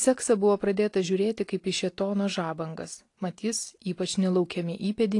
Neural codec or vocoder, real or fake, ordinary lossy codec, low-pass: none; real; AAC, 48 kbps; 10.8 kHz